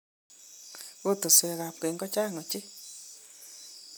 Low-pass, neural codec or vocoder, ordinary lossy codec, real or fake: none; none; none; real